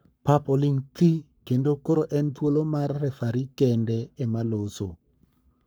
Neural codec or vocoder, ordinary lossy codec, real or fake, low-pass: codec, 44.1 kHz, 7.8 kbps, Pupu-Codec; none; fake; none